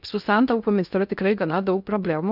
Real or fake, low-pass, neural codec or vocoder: fake; 5.4 kHz; codec, 16 kHz in and 24 kHz out, 0.6 kbps, FocalCodec, streaming, 2048 codes